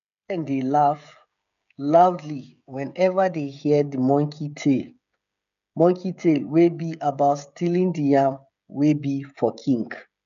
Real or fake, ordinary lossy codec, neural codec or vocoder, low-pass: fake; none; codec, 16 kHz, 16 kbps, FreqCodec, smaller model; 7.2 kHz